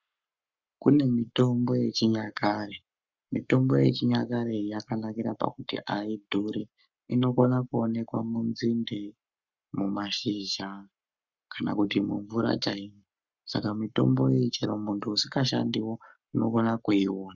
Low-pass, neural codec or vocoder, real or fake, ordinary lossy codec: 7.2 kHz; codec, 44.1 kHz, 7.8 kbps, Pupu-Codec; fake; Opus, 64 kbps